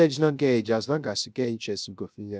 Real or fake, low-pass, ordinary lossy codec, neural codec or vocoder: fake; none; none; codec, 16 kHz, 0.3 kbps, FocalCodec